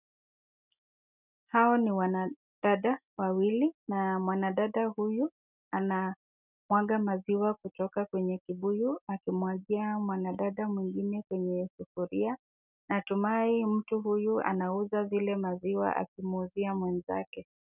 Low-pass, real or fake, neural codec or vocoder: 3.6 kHz; real; none